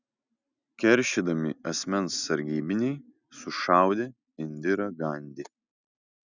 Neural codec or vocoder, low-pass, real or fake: none; 7.2 kHz; real